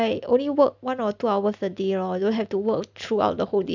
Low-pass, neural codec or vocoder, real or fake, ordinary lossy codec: 7.2 kHz; none; real; none